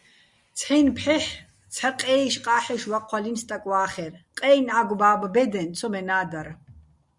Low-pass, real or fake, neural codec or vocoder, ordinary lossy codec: 10.8 kHz; real; none; Opus, 64 kbps